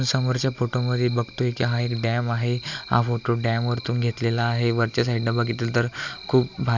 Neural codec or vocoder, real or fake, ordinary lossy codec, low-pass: none; real; none; 7.2 kHz